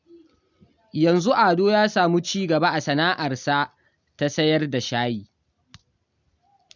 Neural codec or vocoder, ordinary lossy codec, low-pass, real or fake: none; none; 7.2 kHz; real